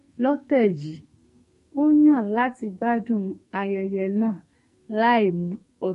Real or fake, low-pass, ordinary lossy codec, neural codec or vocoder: fake; 14.4 kHz; MP3, 48 kbps; codec, 44.1 kHz, 2.6 kbps, SNAC